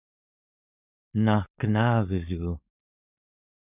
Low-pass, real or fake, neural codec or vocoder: 3.6 kHz; fake; codec, 16 kHz, 4.8 kbps, FACodec